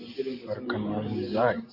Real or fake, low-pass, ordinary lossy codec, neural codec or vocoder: real; 5.4 kHz; AAC, 32 kbps; none